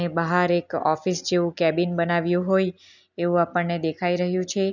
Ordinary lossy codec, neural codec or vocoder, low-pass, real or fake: none; none; 7.2 kHz; real